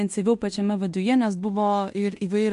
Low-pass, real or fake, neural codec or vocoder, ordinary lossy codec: 10.8 kHz; fake; codec, 16 kHz in and 24 kHz out, 0.9 kbps, LongCat-Audio-Codec, fine tuned four codebook decoder; MP3, 64 kbps